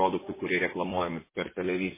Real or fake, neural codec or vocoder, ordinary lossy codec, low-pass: fake; vocoder, 24 kHz, 100 mel bands, Vocos; MP3, 16 kbps; 3.6 kHz